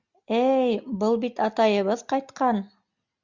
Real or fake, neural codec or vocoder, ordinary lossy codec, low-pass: real; none; Opus, 64 kbps; 7.2 kHz